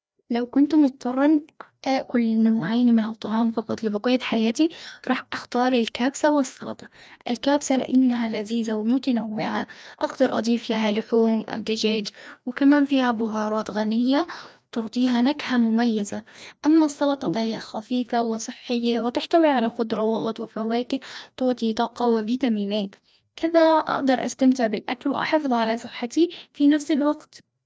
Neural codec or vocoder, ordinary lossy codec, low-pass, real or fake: codec, 16 kHz, 1 kbps, FreqCodec, larger model; none; none; fake